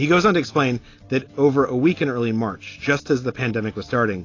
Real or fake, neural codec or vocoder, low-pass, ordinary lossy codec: real; none; 7.2 kHz; AAC, 32 kbps